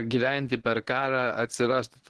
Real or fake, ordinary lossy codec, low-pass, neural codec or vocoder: fake; Opus, 16 kbps; 10.8 kHz; codec, 24 kHz, 0.9 kbps, WavTokenizer, medium speech release version 1